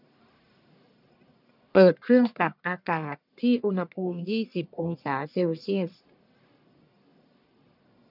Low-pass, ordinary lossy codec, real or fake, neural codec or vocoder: 5.4 kHz; none; fake; codec, 44.1 kHz, 1.7 kbps, Pupu-Codec